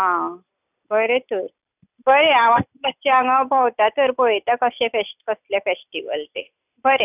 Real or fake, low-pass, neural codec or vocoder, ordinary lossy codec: real; 3.6 kHz; none; none